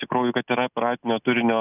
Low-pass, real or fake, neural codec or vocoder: 3.6 kHz; real; none